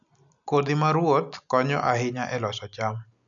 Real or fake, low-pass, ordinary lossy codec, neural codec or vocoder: real; 7.2 kHz; none; none